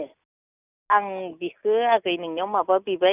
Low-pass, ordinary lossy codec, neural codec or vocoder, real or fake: 3.6 kHz; none; none; real